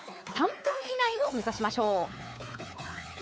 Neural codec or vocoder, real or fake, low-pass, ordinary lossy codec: codec, 16 kHz, 4 kbps, X-Codec, WavLM features, trained on Multilingual LibriSpeech; fake; none; none